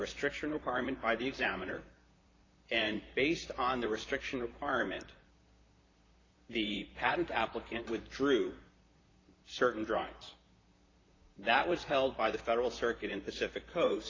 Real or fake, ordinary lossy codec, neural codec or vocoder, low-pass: fake; AAC, 32 kbps; vocoder, 44.1 kHz, 128 mel bands, Pupu-Vocoder; 7.2 kHz